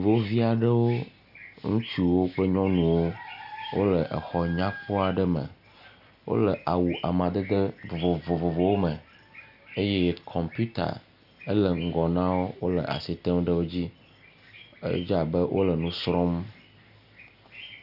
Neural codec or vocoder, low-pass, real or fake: none; 5.4 kHz; real